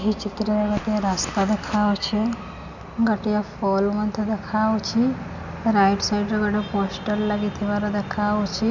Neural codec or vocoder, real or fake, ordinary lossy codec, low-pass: none; real; none; 7.2 kHz